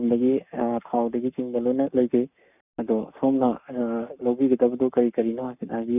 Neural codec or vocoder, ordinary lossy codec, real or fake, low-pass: codec, 44.1 kHz, 7.8 kbps, Pupu-Codec; none; fake; 3.6 kHz